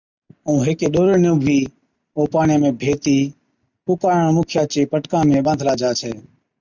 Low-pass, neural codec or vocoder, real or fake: 7.2 kHz; none; real